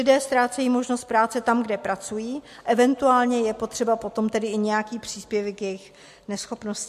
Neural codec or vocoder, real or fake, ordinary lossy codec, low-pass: none; real; MP3, 64 kbps; 14.4 kHz